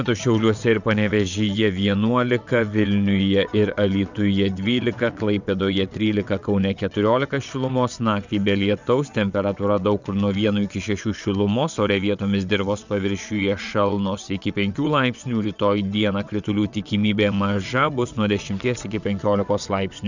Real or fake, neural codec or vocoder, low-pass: fake; vocoder, 22.05 kHz, 80 mel bands, WaveNeXt; 7.2 kHz